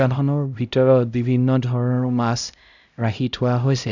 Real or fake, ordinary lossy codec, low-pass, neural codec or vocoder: fake; none; 7.2 kHz; codec, 16 kHz, 0.5 kbps, X-Codec, HuBERT features, trained on LibriSpeech